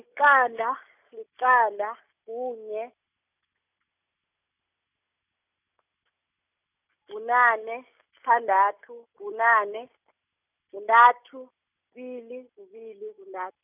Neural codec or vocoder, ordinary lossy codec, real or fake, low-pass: none; none; real; 3.6 kHz